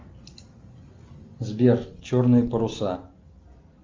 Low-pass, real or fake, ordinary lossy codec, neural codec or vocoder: 7.2 kHz; real; Opus, 32 kbps; none